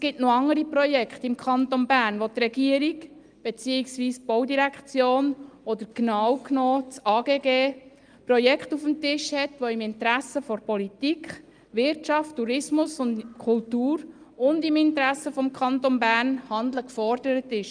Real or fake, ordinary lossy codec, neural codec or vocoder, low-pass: real; Opus, 32 kbps; none; 9.9 kHz